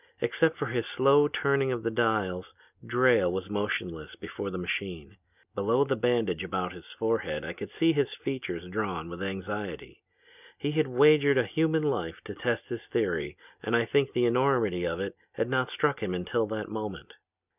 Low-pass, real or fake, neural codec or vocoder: 3.6 kHz; real; none